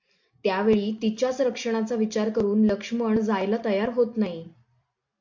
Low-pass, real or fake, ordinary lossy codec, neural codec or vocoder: 7.2 kHz; real; MP3, 64 kbps; none